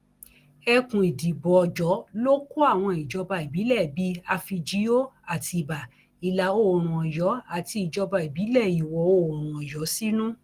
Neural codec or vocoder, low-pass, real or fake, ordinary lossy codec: none; 14.4 kHz; real; Opus, 24 kbps